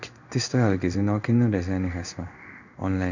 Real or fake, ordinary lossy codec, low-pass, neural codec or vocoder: fake; none; 7.2 kHz; codec, 16 kHz in and 24 kHz out, 1 kbps, XY-Tokenizer